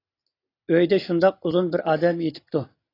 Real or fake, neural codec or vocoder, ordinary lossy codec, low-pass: real; none; AAC, 24 kbps; 5.4 kHz